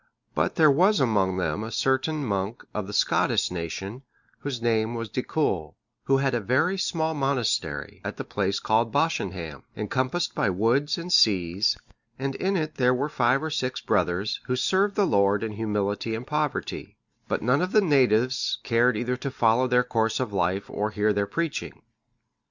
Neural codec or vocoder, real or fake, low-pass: none; real; 7.2 kHz